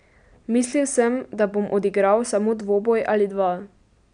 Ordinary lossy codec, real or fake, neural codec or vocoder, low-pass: none; real; none; 9.9 kHz